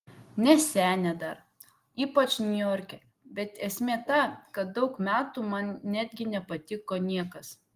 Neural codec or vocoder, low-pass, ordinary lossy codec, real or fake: none; 14.4 kHz; Opus, 24 kbps; real